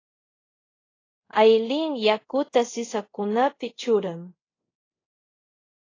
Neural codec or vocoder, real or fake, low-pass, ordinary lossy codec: codec, 24 kHz, 0.5 kbps, DualCodec; fake; 7.2 kHz; AAC, 32 kbps